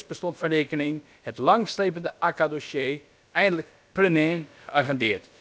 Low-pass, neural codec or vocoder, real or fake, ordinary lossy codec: none; codec, 16 kHz, about 1 kbps, DyCAST, with the encoder's durations; fake; none